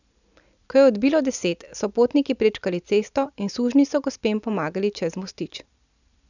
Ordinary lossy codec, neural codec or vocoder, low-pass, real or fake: none; none; 7.2 kHz; real